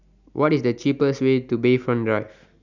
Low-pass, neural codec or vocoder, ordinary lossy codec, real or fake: 7.2 kHz; none; none; real